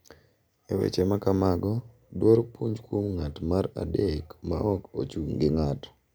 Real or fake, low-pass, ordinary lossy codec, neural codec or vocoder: real; none; none; none